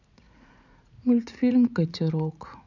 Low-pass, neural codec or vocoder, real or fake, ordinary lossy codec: 7.2 kHz; vocoder, 44.1 kHz, 80 mel bands, Vocos; fake; none